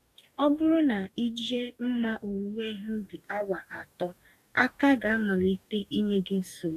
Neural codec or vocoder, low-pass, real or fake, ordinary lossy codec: codec, 44.1 kHz, 2.6 kbps, DAC; 14.4 kHz; fake; none